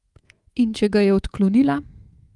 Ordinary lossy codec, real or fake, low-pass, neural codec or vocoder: Opus, 24 kbps; fake; 10.8 kHz; codec, 24 kHz, 3.1 kbps, DualCodec